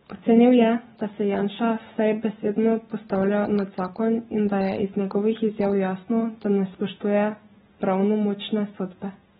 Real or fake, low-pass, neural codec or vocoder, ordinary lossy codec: real; 7.2 kHz; none; AAC, 16 kbps